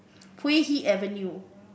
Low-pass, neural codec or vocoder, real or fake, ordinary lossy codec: none; none; real; none